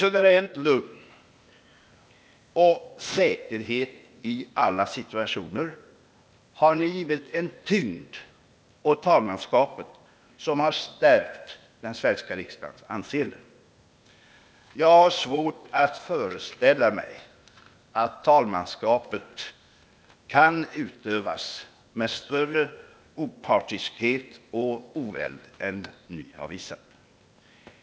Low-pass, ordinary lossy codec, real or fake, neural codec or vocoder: none; none; fake; codec, 16 kHz, 0.8 kbps, ZipCodec